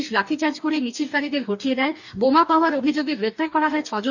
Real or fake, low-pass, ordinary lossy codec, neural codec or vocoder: fake; 7.2 kHz; none; codec, 44.1 kHz, 2.6 kbps, DAC